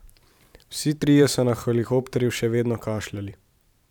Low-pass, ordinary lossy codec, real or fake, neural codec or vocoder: 19.8 kHz; none; fake; vocoder, 44.1 kHz, 128 mel bands every 512 samples, BigVGAN v2